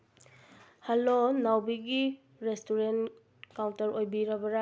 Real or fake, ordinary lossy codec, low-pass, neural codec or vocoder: real; none; none; none